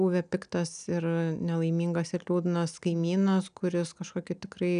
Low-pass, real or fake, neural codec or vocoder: 9.9 kHz; real; none